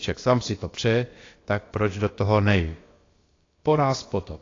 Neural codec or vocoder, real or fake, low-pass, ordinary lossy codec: codec, 16 kHz, about 1 kbps, DyCAST, with the encoder's durations; fake; 7.2 kHz; AAC, 32 kbps